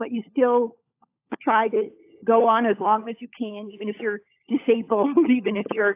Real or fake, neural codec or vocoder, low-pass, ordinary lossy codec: fake; codec, 16 kHz, 16 kbps, FunCodec, trained on LibriTTS, 50 frames a second; 3.6 kHz; AAC, 24 kbps